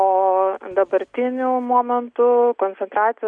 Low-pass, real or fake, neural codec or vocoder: 9.9 kHz; real; none